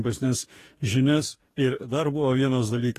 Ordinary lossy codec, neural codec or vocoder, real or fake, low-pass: AAC, 48 kbps; codec, 44.1 kHz, 2.6 kbps, DAC; fake; 14.4 kHz